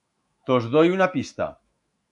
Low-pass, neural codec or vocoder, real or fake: 10.8 kHz; autoencoder, 48 kHz, 128 numbers a frame, DAC-VAE, trained on Japanese speech; fake